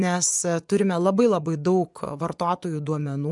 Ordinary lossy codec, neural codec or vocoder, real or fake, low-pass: MP3, 96 kbps; vocoder, 24 kHz, 100 mel bands, Vocos; fake; 10.8 kHz